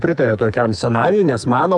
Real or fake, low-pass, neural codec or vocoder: fake; 10.8 kHz; codec, 44.1 kHz, 2.6 kbps, SNAC